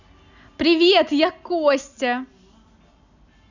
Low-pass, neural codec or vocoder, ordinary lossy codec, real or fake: 7.2 kHz; none; none; real